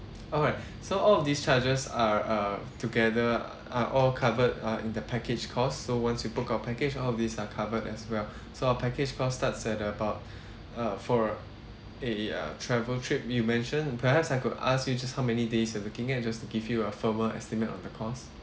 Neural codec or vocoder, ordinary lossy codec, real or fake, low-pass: none; none; real; none